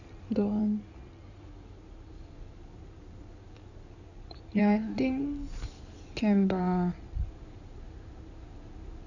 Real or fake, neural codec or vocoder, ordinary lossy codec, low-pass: fake; codec, 16 kHz in and 24 kHz out, 2.2 kbps, FireRedTTS-2 codec; none; 7.2 kHz